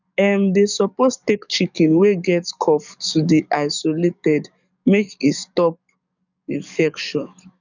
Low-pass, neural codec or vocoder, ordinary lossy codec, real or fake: 7.2 kHz; codec, 44.1 kHz, 7.8 kbps, DAC; none; fake